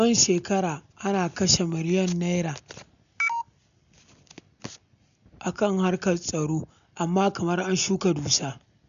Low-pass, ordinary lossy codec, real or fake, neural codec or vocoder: 7.2 kHz; none; real; none